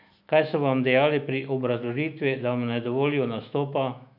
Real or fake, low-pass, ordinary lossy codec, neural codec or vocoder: fake; 5.4 kHz; none; autoencoder, 48 kHz, 128 numbers a frame, DAC-VAE, trained on Japanese speech